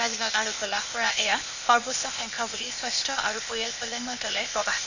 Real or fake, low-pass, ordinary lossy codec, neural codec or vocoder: fake; 7.2 kHz; none; codec, 16 kHz, 0.8 kbps, ZipCodec